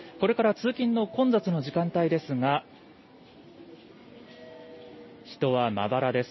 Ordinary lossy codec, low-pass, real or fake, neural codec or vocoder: MP3, 24 kbps; 7.2 kHz; real; none